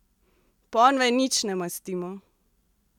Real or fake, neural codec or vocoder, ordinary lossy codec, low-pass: real; none; none; 19.8 kHz